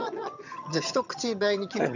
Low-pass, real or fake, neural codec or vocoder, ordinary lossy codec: 7.2 kHz; fake; vocoder, 22.05 kHz, 80 mel bands, HiFi-GAN; none